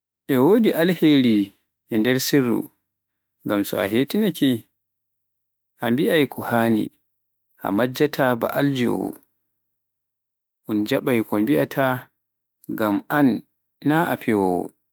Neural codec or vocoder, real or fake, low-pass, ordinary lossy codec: autoencoder, 48 kHz, 32 numbers a frame, DAC-VAE, trained on Japanese speech; fake; none; none